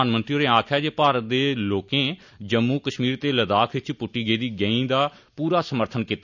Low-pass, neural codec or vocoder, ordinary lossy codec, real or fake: 7.2 kHz; none; none; real